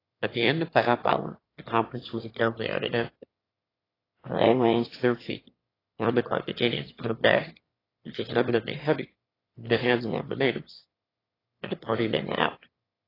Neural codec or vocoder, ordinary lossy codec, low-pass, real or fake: autoencoder, 22.05 kHz, a latent of 192 numbers a frame, VITS, trained on one speaker; AAC, 24 kbps; 5.4 kHz; fake